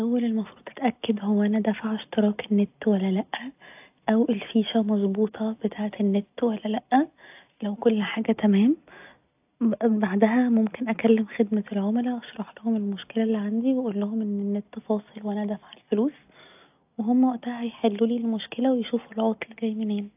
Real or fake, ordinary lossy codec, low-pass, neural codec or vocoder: real; AAC, 32 kbps; 3.6 kHz; none